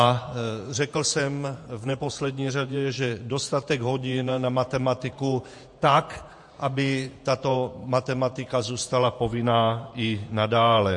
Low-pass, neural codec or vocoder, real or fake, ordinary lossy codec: 10.8 kHz; vocoder, 48 kHz, 128 mel bands, Vocos; fake; MP3, 48 kbps